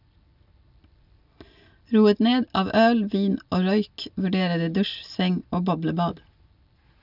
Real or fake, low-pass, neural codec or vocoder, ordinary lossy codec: real; 5.4 kHz; none; none